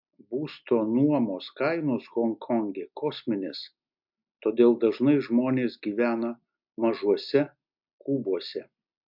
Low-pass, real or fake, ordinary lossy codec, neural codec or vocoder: 5.4 kHz; real; MP3, 48 kbps; none